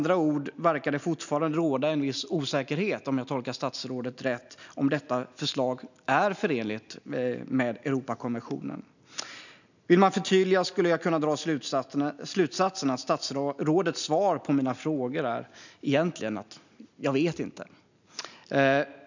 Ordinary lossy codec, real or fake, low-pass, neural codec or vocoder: none; real; 7.2 kHz; none